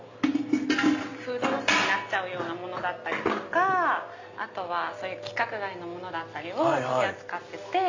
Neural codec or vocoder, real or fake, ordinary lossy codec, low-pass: none; real; AAC, 48 kbps; 7.2 kHz